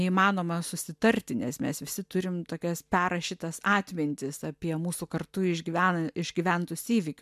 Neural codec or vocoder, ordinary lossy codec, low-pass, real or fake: none; MP3, 96 kbps; 14.4 kHz; real